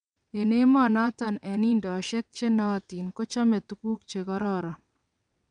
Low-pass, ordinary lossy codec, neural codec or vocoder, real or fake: 9.9 kHz; none; vocoder, 22.05 kHz, 80 mel bands, WaveNeXt; fake